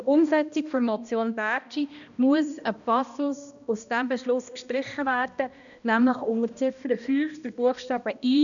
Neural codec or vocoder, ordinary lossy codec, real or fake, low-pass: codec, 16 kHz, 1 kbps, X-Codec, HuBERT features, trained on balanced general audio; none; fake; 7.2 kHz